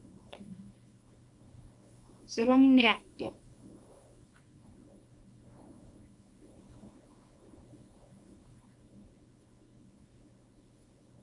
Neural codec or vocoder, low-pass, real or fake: codec, 24 kHz, 0.9 kbps, WavTokenizer, small release; 10.8 kHz; fake